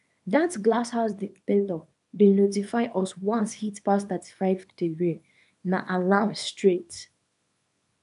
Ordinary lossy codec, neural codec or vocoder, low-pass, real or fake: none; codec, 24 kHz, 0.9 kbps, WavTokenizer, small release; 10.8 kHz; fake